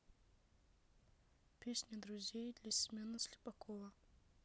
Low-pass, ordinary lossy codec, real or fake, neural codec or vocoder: none; none; real; none